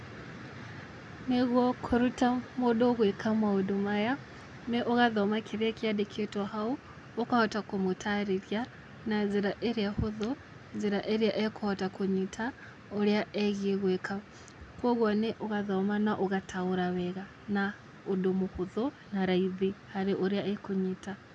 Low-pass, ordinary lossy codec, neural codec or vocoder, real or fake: 10.8 kHz; none; none; real